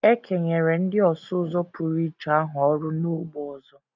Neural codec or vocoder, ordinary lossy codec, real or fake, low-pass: none; none; real; 7.2 kHz